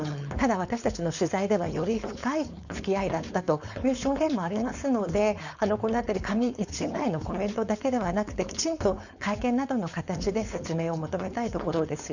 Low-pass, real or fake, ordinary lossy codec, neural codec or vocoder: 7.2 kHz; fake; none; codec, 16 kHz, 4.8 kbps, FACodec